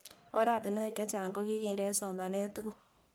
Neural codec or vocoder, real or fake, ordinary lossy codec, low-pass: codec, 44.1 kHz, 1.7 kbps, Pupu-Codec; fake; none; none